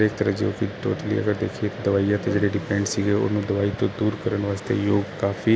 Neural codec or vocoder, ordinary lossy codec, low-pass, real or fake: none; none; none; real